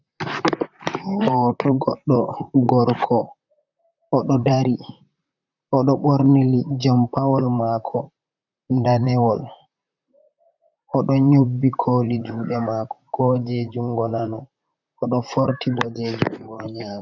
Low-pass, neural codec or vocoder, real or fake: 7.2 kHz; vocoder, 44.1 kHz, 128 mel bands, Pupu-Vocoder; fake